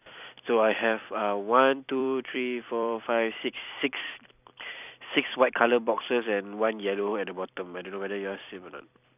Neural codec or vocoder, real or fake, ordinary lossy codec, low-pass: vocoder, 44.1 kHz, 128 mel bands every 256 samples, BigVGAN v2; fake; none; 3.6 kHz